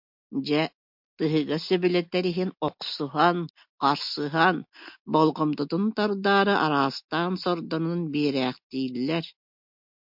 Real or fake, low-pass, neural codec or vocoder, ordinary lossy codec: real; 5.4 kHz; none; MP3, 48 kbps